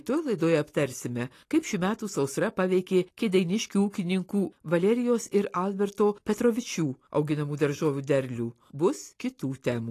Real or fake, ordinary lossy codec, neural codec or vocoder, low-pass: real; AAC, 48 kbps; none; 14.4 kHz